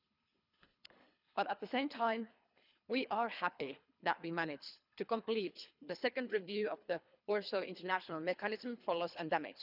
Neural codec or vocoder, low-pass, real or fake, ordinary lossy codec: codec, 24 kHz, 3 kbps, HILCodec; 5.4 kHz; fake; none